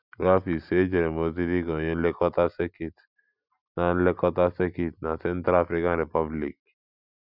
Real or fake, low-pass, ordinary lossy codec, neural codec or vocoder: real; 5.4 kHz; none; none